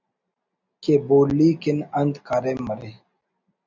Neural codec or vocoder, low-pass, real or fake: none; 7.2 kHz; real